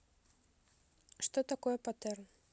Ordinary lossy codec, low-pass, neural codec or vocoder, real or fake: none; none; none; real